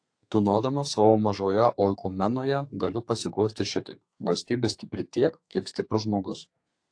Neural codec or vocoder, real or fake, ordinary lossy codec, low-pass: codec, 32 kHz, 1.9 kbps, SNAC; fake; AAC, 48 kbps; 9.9 kHz